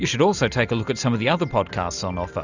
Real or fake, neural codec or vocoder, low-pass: real; none; 7.2 kHz